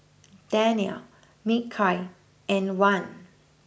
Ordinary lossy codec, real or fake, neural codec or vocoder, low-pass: none; real; none; none